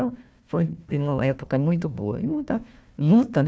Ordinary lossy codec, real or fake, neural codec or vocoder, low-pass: none; fake; codec, 16 kHz, 1 kbps, FunCodec, trained on Chinese and English, 50 frames a second; none